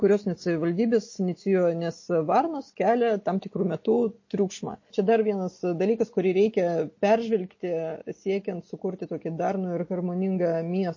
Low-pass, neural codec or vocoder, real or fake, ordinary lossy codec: 7.2 kHz; none; real; MP3, 32 kbps